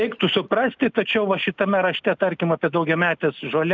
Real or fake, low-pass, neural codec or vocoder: real; 7.2 kHz; none